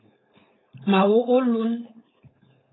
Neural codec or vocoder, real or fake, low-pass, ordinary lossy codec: codec, 16 kHz, 4.8 kbps, FACodec; fake; 7.2 kHz; AAC, 16 kbps